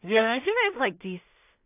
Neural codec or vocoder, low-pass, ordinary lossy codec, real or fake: codec, 16 kHz in and 24 kHz out, 0.4 kbps, LongCat-Audio-Codec, two codebook decoder; 3.6 kHz; AAC, 24 kbps; fake